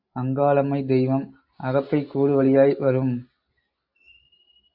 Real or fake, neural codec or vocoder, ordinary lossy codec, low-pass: real; none; AAC, 48 kbps; 5.4 kHz